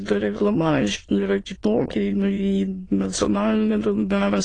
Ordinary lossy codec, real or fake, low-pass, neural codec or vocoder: AAC, 32 kbps; fake; 9.9 kHz; autoencoder, 22.05 kHz, a latent of 192 numbers a frame, VITS, trained on many speakers